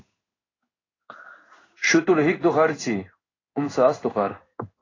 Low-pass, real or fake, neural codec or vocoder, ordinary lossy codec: 7.2 kHz; fake; codec, 16 kHz in and 24 kHz out, 1 kbps, XY-Tokenizer; AAC, 32 kbps